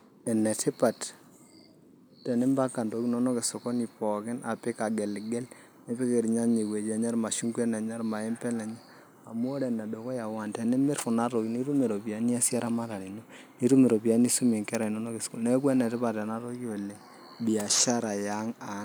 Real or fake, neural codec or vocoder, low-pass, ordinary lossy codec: real; none; none; none